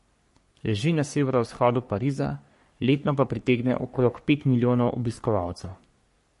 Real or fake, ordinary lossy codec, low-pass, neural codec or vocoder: fake; MP3, 48 kbps; 14.4 kHz; codec, 44.1 kHz, 3.4 kbps, Pupu-Codec